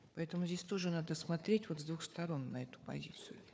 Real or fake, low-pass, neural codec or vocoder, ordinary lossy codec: fake; none; codec, 16 kHz, 4 kbps, FunCodec, trained on Chinese and English, 50 frames a second; none